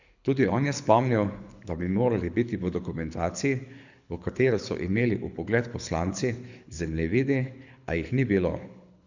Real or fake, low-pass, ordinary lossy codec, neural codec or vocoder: fake; 7.2 kHz; none; codec, 24 kHz, 6 kbps, HILCodec